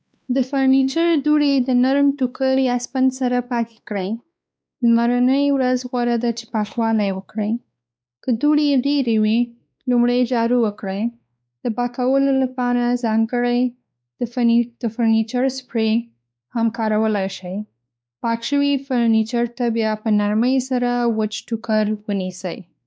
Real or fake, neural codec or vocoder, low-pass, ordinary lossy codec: fake; codec, 16 kHz, 2 kbps, X-Codec, WavLM features, trained on Multilingual LibriSpeech; none; none